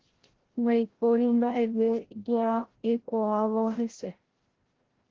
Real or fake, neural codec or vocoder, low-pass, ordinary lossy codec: fake; codec, 16 kHz, 0.5 kbps, FreqCodec, larger model; 7.2 kHz; Opus, 16 kbps